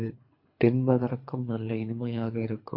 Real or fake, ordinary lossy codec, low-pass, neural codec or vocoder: fake; MP3, 32 kbps; 5.4 kHz; codec, 24 kHz, 3 kbps, HILCodec